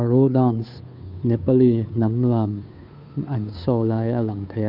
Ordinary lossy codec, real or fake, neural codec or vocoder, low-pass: none; fake; codec, 16 kHz, 2 kbps, FunCodec, trained on Chinese and English, 25 frames a second; 5.4 kHz